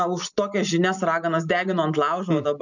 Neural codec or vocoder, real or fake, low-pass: none; real; 7.2 kHz